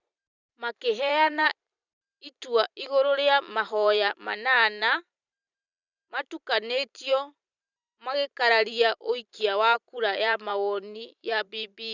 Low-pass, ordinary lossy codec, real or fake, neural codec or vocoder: 7.2 kHz; none; fake; vocoder, 44.1 kHz, 128 mel bands every 512 samples, BigVGAN v2